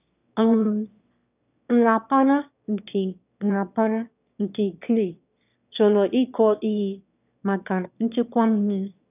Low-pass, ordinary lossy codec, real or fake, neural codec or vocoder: 3.6 kHz; none; fake; autoencoder, 22.05 kHz, a latent of 192 numbers a frame, VITS, trained on one speaker